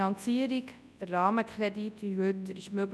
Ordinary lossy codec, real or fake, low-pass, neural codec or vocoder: none; fake; none; codec, 24 kHz, 0.9 kbps, WavTokenizer, large speech release